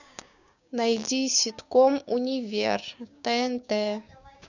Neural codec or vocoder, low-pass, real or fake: codec, 16 kHz, 6 kbps, DAC; 7.2 kHz; fake